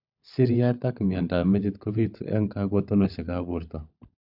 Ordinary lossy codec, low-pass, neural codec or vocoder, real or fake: none; 5.4 kHz; codec, 16 kHz, 16 kbps, FunCodec, trained on LibriTTS, 50 frames a second; fake